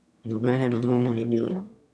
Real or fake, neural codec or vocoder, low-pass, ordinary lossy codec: fake; autoencoder, 22.05 kHz, a latent of 192 numbers a frame, VITS, trained on one speaker; none; none